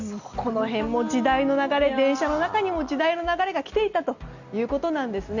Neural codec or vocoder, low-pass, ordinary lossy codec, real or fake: none; 7.2 kHz; Opus, 64 kbps; real